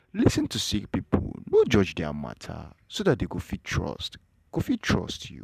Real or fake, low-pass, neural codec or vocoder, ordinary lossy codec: fake; 14.4 kHz; vocoder, 44.1 kHz, 128 mel bands every 512 samples, BigVGAN v2; none